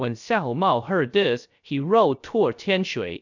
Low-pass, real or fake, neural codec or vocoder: 7.2 kHz; fake; codec, 16 kHz, 0.3 kbps, FocalCodec